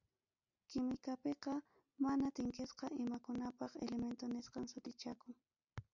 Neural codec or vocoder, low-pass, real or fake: none; 7.2 kHz; real